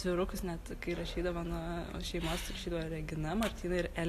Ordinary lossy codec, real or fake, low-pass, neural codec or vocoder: AAC, 48 kbps; real; 14.4 kHz; none